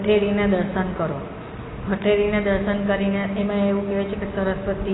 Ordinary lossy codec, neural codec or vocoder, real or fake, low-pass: AAC, 16 kbps; none; real; 7.2 kHz